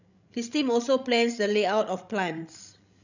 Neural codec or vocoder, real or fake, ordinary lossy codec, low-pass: codec, 16 kHz, 16 kbps, FreqCodec, larger model; fake; none; 7.2 kHz